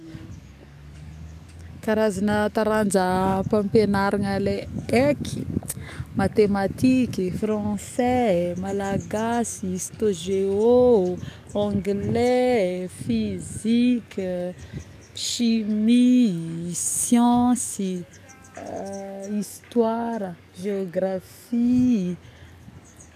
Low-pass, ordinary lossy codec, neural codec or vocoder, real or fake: 14.4 kHz; none; codec, 44.1 kHz, 7.8 kbps, DAC; fake